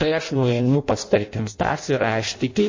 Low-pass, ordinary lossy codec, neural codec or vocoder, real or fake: 7.2 kHz; MP3, 32 kbps; codec, 16 kHz in and 24 kHz out, 0.6 kbps, FireRedTTS-2 codec; fake